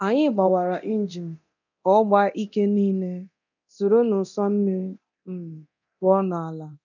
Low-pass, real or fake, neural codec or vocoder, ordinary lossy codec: 7.2 kHz; fake; codec, 24 kHz, 0.9 kbps, DualCodec; none